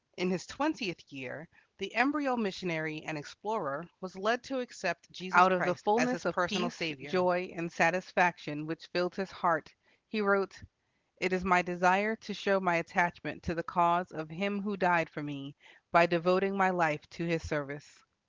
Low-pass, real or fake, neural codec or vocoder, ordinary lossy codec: 7.2 kHz; real; none; Opus, 16 kbps